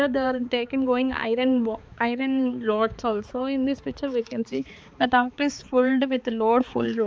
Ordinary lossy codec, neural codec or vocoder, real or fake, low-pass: none; codec, 16 kHz, 4 kbps, X-Codec, HuBERT features, trained on balanced general audio; fake; none